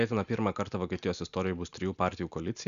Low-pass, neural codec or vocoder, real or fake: 7.2 kHz; none; real